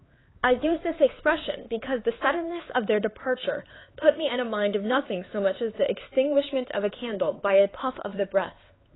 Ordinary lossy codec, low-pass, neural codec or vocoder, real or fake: AAC, 16 kbps; 7.2 kHz; codec, 16 kHz, 4 kbps, X-Codec, HuBERT features, trained on LibriSpeech; fake